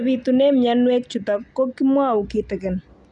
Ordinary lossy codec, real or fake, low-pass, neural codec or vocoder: none; real; 10.8 kHz; none